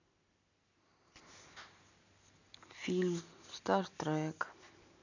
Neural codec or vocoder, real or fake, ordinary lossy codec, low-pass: none; real; none; 7.2 kHz